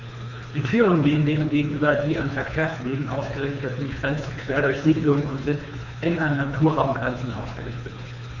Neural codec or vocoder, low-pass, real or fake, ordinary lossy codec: codec, 24 kHz, 3 kbps, HILCodec; 7.2 kHz; fake; none